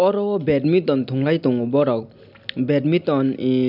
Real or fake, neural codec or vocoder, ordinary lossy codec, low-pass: real; none; none; 5.4 kHz